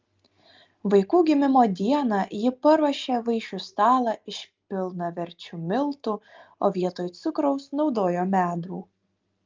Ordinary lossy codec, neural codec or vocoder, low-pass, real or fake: Opus, 32 kbps; none; 7.2 kHz; real